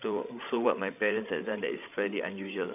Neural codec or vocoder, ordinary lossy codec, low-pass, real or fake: codec, 16 kHz, 16 kbps, FreqCodec, larger model; none; 3.6 kHz; fake